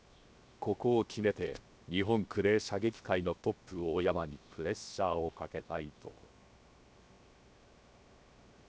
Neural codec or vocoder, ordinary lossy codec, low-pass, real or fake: codec, 16 kHz, 0.7 kbps, FocalCodec; none; none; fake